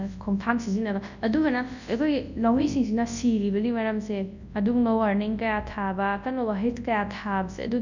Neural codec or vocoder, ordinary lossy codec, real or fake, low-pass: codec, 24 kHz, 0.9 kbps, WavTokenizer, large speech release; none; fake; 7.2 kHz